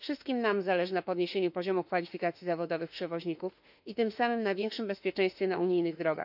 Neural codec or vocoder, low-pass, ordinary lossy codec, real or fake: autoencoder, 48 kHz, 32 numbers a frame, DAC-VAE, trained on Japanese speech; 5.4 kHz; none; fake